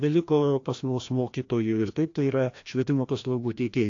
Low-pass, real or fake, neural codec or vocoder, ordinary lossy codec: 7.2 kHz; fake; codec, 16 kHz, 1 kbps, FreqCodec, larger model; AAC, 48 kbps